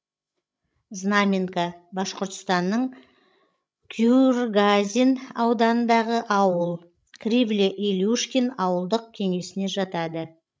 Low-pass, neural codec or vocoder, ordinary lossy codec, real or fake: none; codec, 16 kHz, 16 kbps, FreqCodec, larger model; none; fake